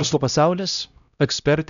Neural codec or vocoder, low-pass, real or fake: codec, 16 kHz, 0.5 kbps, X-Codec, HuBERT features, trained on LibriSpeech; 7.2 kHz; fake